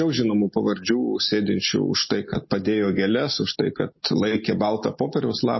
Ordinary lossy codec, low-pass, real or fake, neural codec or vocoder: MP3, 24 kbps; 7.2 kHz; real; none